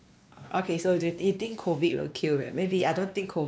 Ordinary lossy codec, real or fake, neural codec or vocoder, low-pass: none; fake; codec, 16 kHz, 2 kbps, X-Codec, WavLM features, trained on Multilingual LibriSpeech; none